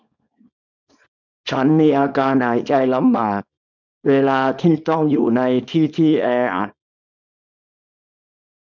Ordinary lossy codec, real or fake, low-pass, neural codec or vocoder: none; fake; 7.2 kHz; codec, 24 kHz, 0.9 kbps, WavTokenizer, small release